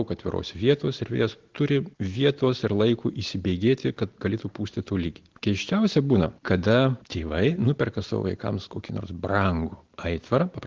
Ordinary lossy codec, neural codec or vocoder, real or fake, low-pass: Opus, 16 kbps; none; real; 7.2 kHz